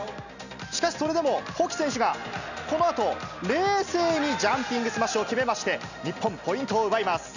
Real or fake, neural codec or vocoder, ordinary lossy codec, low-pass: real; none; none; 7.2 kHz